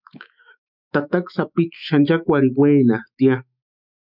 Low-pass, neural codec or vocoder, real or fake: 5.4 kHz; autoencoder, 48 kHz, 128 numbers a frame, DAC-VAE, trained on Japanese speech; fake